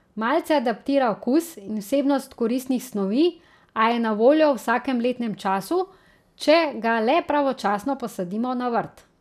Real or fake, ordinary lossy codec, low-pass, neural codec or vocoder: real; none; 14.4 kHz; none